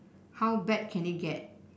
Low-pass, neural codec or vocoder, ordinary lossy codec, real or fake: none; none; none; real